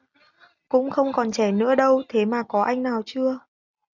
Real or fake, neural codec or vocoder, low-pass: real; none; 7.2 kHz